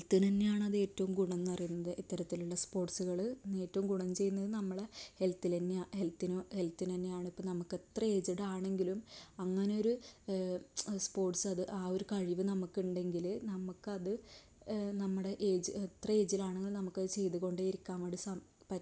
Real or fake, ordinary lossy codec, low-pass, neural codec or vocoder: real; none; none; none